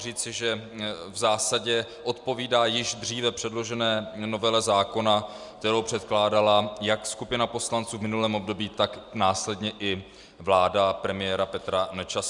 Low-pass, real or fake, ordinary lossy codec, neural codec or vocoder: 10.8 kHz; real; Opus, 64 kbps; none